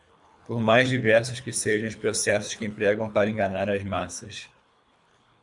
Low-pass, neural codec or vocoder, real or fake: 10.8 kHz; codec, 24 kHz, 3 kbps, HILCodec; fake